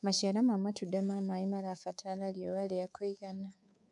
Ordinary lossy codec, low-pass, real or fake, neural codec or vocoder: none; 10.8 kHz; fake; codec, 24 kHz, 3.1 kbps, DualCodec